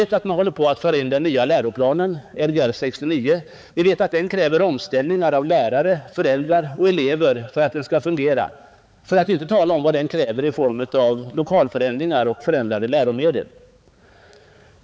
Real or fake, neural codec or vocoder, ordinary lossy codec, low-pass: fake; codec, 16 kHz, 4 kbps, X-Codec, HuBERT features, trained on balanced general audio; none; none